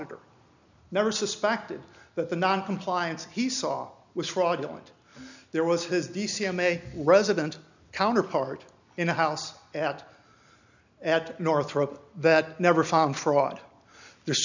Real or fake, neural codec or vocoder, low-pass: real; none; 7.2 kHz